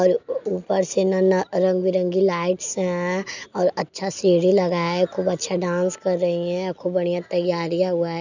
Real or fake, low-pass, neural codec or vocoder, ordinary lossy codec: real; 7.2 kHz; none; none